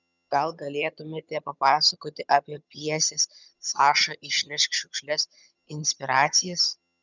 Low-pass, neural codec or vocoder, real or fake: 7.2 kHz; vocoder, 22.05 kHz, 80 mel bands, HiFi-GAN; fake